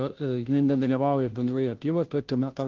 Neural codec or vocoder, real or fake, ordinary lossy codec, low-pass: codec, 16 kHz, 0.5 kbps, FunCodec, trained on Chinese and English, 25 frames a second; fake; Opus, 16 kbps; 7.2 kHz